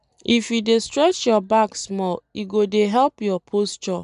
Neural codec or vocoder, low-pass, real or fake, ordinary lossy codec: none; 10.8 kHz; real; none